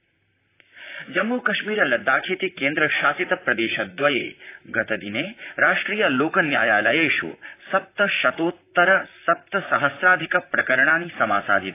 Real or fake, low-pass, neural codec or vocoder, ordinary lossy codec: fake; 3.6 kHz; vocoder, 44.1 kHz, 128 mel bands, Pupu-Vocoder; AAC, 24 kbps